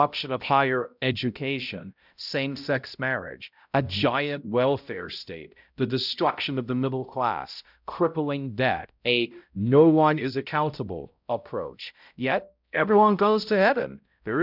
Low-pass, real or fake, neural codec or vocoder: 5.4 kHz; fake; codec, 16 kHz, 0.5 kbps, X-Codec, HuBERT features, trained on balanced general audio